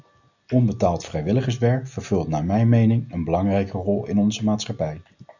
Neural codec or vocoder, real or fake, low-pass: none; real; 7.2 kHz